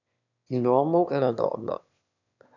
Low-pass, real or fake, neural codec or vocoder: 7.2 kHz; fake; autoencoder, 22.05 kHz, a latent of 192 numbers a frame, VITS, trained on one speaker